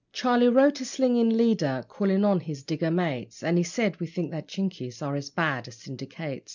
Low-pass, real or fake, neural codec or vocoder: 7.2 kHz; real; none